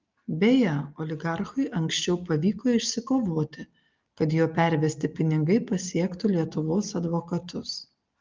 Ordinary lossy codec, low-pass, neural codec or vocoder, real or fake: Opus, 32 kbps; 7.2 kHz; none; real